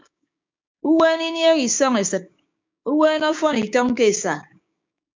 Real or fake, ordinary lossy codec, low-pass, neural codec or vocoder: fake; MP3, 64 kbps; 7.2 kHz; codec, 16 kHz, 6 kbps, DAC